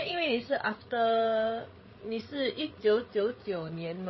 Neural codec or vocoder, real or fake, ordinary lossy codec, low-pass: codec, 16 kHz, 8 kbps, FreqCodec, larger model; fake; MP3, 24 kbps; 7.2 kHz